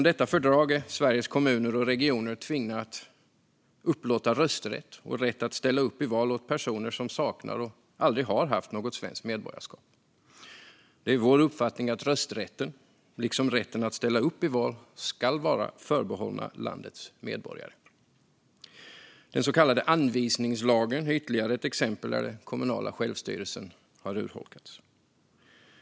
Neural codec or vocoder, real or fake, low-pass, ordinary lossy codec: none; real; none; none